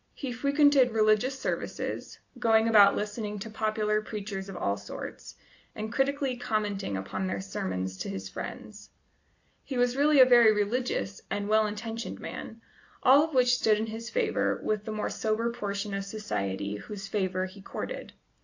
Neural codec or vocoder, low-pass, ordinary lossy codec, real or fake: none; 7.2 kHz; AAC, 48 kbps; real